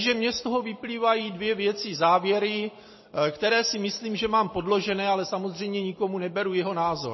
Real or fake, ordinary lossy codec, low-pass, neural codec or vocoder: real; MP3, 24 kbps; 7.2 kHz; none